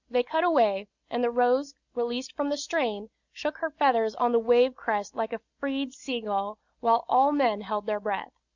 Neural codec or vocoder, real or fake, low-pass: none; real; 7.2 kHz